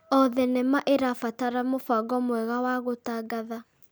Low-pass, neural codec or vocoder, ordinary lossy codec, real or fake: none; none; none; real